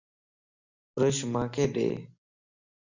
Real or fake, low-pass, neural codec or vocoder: real; 7.2 kHz; none